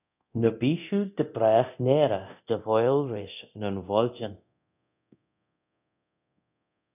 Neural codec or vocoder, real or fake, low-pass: codec, 24 kHz, 0.9 kbps, DualCodec; fake; 3.6 kHz